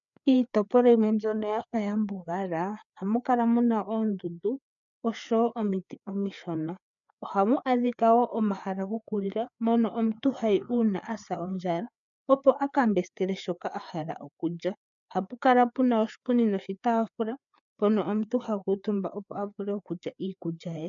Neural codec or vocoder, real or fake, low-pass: codec, 16 kHz, 4 kbps, FreqCodec, larger model; fake; 7.2 kHz